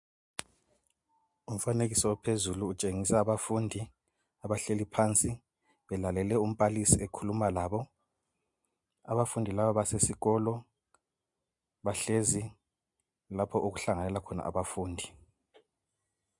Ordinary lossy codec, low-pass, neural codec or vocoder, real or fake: MP3, 64 kbps; 10.8 kHz; none; real